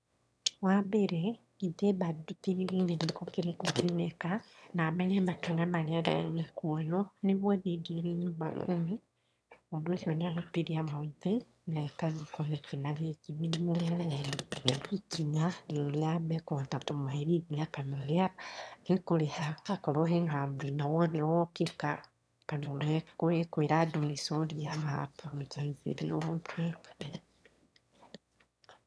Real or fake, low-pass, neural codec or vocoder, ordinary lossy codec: fake; none; autoencoder, 22.05 kHz, a latent of 192 numbers a frame, VITS, trained on one speaker; none